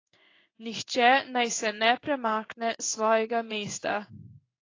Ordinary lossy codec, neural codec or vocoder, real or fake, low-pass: AAC, 32 kbps; codec, 16 kHz in and 24 kHz out, 1 kbps, XY-Tokenizer; fake; 7.2 kHz